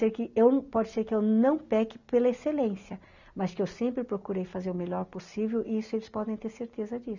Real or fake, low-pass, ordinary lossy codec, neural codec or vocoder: real; 7.2 kHz; none; none